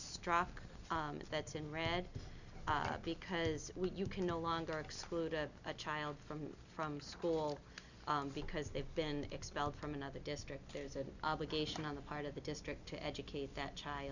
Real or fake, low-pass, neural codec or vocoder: real; 7.2 kHz; none